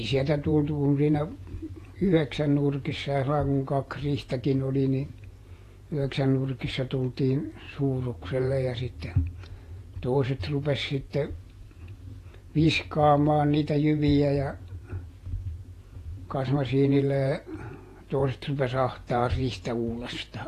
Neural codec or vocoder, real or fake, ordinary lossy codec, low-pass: vocoder, 48 kHz, 128 mel bands, Vocos; fake; AAC, 48 kbps; 14.4 kHz